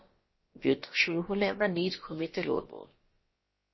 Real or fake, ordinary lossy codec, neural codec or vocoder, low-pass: fake; MP3, 24 kbps; codec, 16 kHz, about 1 kbps, DyCAST, with the encoder's durations; 7.2 kHz